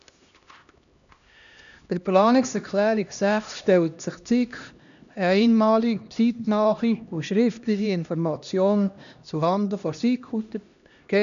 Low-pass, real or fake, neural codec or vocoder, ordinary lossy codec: 7.2 kHz; fake; codec, 16 kHz, 1 kbps, X-Codec, HuBERT features, trained on LibriSpeech; none